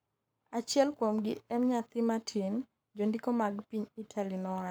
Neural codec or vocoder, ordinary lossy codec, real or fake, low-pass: codec, 44.1 kHz, 7.8 kbps, Pupu-Codec; none; fake; none